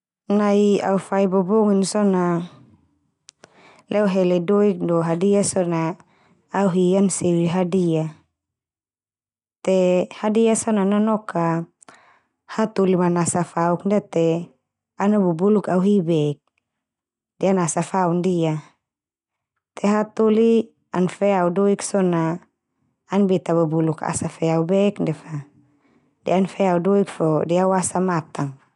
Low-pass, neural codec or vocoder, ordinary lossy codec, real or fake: 10.8 kHz; none; none; real